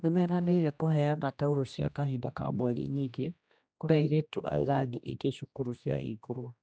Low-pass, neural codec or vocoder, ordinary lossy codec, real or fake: none; codec, 16 kHz, 1 kbps, X-Codec, HuBERT features, trained on general audio; none; fake